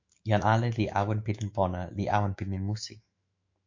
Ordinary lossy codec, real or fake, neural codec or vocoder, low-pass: MP3, 48 kbps; fake; autoencoder, 48 kHz, 128 numbers a frame, DAC-VAE, trained on Japanese speech; 7.2 kHz